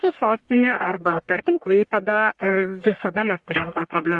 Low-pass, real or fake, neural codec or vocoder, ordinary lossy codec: 10.8 kHz; fake; codec, 44.1 kHz, 1.7 kbps, Pupu-Codec; Opus, 64 kbps